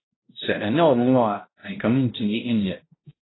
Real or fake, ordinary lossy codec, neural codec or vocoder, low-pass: fake; AAC, 16 kbps; codec, 16 kHz, 0.5 kbps, X-Codec, HuBERT features, trained on balanced general audio; 7.2 kHz